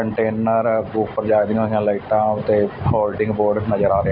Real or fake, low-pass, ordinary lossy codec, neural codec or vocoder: real; 5.4 kHz; none; none